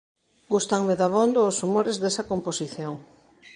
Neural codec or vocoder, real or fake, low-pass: vocoder, 22.05 kHz, 80 mel bands, Vocos; fake; 9.9 kHz